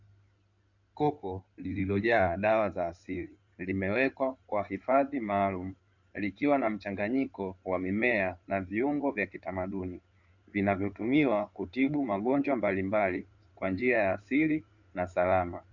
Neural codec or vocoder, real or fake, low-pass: codec, 16 kHz in and 24 kHz out, 2.2 kbps, FireRedTTS-2 codec; fake; 7.2 kHz